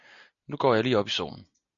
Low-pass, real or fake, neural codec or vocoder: 7.2 kHz; real; none